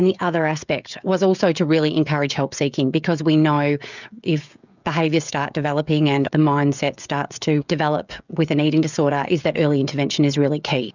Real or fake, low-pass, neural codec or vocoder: fake; 7.2 kHz; codec, 16 kHz, 16 kbps, FreqCodec, smaller model